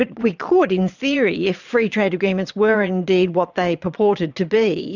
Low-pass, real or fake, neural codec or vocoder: 7.2 kHz; fake; vocoder, 22.05 kHz, 80 mel bands, Vocos